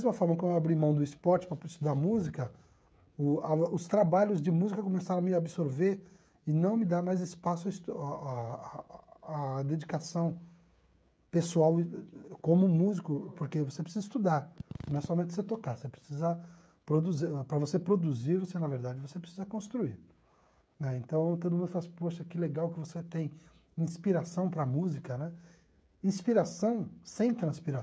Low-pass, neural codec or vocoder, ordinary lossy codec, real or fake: none; codec, 16 kHz, 16 kbps, FreqCodec, smaller model; none; fake